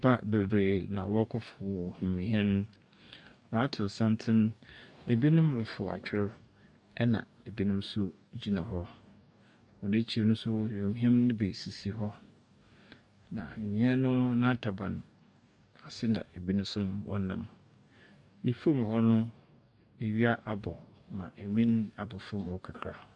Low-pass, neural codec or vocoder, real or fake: 10.8 kHz; codec, 44.1 kHz, 2.6 kbps, DAC; fake